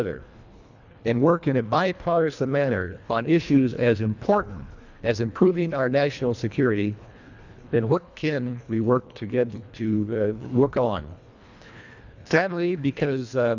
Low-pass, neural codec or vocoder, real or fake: 7.2 kHz; codec, 24 kHz, 1.5 kbps, HILCodec; fake